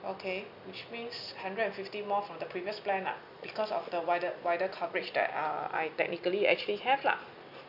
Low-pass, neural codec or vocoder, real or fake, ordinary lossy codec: 5.4 kHz; none; real; none